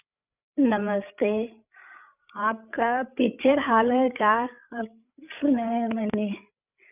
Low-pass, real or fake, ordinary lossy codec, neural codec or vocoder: 3.6 kHz; fake; none; codec, 16 kHz, 8 kbps, FreqCodec, larger model